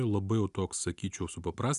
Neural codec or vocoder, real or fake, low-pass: none; real; 10.8 kHz